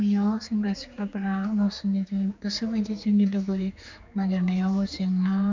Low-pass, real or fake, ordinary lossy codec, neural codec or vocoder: 7.2 kHz; fake; MP3, 64 kbps; codec, 16 kHz, 4 kbps, X-Codec, HuBERT features, trained on general audio